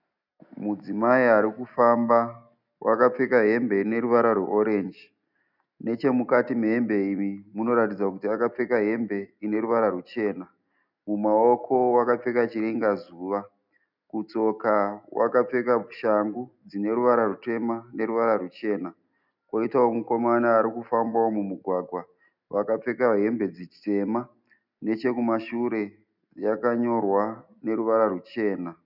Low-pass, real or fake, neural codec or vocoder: 5.4 kHz; real; none